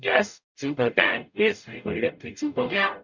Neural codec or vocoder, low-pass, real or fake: codec, 44.1 kHz, 0.9 kbps, DAC; 7.2 kHz; fake